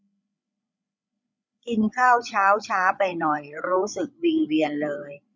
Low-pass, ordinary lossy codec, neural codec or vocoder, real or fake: none; none; codec, 16 kHz, 16 kbps, FreqCodec, larger model; fake